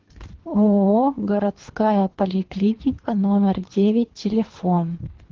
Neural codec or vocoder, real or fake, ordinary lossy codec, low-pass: codec, 24 kHz, 3 kbps, HILCodec; fake; Opus, 16 kbps; 7.2 kHz